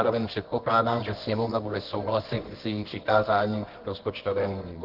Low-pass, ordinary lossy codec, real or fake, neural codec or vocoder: 5.4 kHz; Opus, 16 kbps; fake; codec, 24 kHz, 0.9 kbps, WavTokenizer, medium music audio release